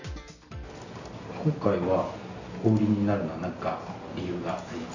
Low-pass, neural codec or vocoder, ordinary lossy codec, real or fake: 7.2 kHz; none; none; real